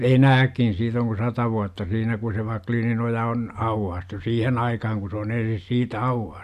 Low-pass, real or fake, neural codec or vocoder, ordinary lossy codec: 14.4 kHz; real; none; none